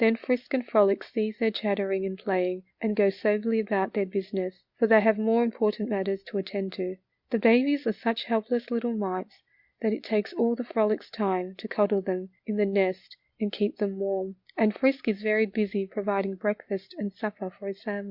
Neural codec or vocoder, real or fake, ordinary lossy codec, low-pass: none; real; Opus, 64 kbps; 5.4 kHz